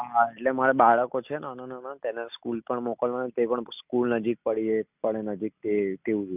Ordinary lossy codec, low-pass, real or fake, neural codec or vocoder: none; 3.6 kHz; real; none